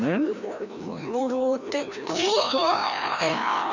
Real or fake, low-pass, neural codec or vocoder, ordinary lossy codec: fake; 7.2 kHz; codec, 16 kHz, 1 kbps, FreqCodec, larger model; none